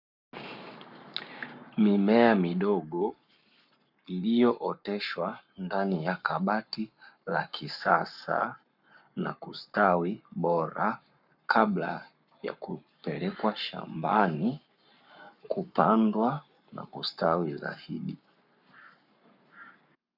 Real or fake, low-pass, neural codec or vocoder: fake; 5.4 kHz; codec, 44.1 kHz, 7.8 kbps, Pupu-Codec